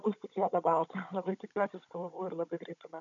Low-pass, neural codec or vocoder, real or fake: 7.2 kHz; codec, 16 kHz, 16 kbps, FunCodec, trained on Chinese and English, 50 frames a second; fake